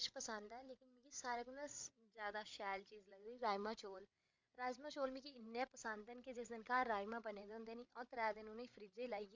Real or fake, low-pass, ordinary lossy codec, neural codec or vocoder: fake; 7.2 kHz; MP3, 64 kbps; codec, 16 kHz, 8 kbps, FreqCodec, larger model